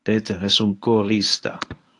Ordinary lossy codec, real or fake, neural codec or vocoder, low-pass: AAC, 48 kbps; fake; codec, 24 kHz, 0.9 kbps, WavTokenizer, medium speech release version 1; 10.8 kHz